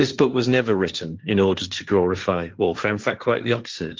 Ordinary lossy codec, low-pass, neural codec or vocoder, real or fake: Opus, 32 kbps; 7.2 kHz; codec, 16 kHz, 1.1 kbps, Voila-Tokenizer; fake